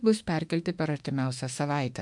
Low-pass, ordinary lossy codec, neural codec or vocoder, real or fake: 10.8 kHz; MP3, 64 kbps; autoencoder, 48 kHz, 32 numbers a frame, DAC-VAE, trained on Japanese speech; fake